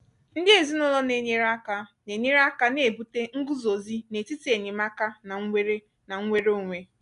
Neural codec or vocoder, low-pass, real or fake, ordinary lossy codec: none; 10.8 kHz; real; none